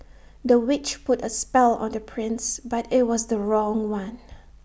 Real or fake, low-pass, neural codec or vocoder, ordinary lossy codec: real; none; none; none